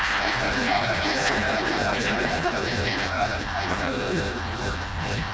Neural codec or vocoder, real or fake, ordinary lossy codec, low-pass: codec, 16 kHz, 1 kbps, FreqCodec, smaller model; fake; none; none